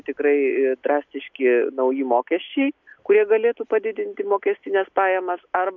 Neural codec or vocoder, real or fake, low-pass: none; real; 7.2 kHz